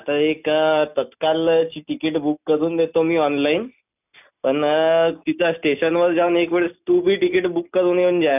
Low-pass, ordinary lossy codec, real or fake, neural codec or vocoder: 3.6 kHz; none; real; none